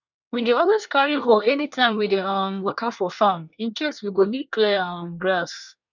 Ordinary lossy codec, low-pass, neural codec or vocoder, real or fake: none; 7.2 kHz; codec, 24 kHz, 1 kbps, SNAC; fake